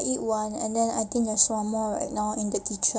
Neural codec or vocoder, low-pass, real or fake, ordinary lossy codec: none; none; real; none